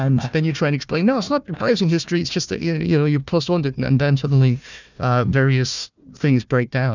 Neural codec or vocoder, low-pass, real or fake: codec, 16 kHz, 1 kbps, FunCodec, trained on Chinese and English, 50 frames a second; 7.2 kHz; fake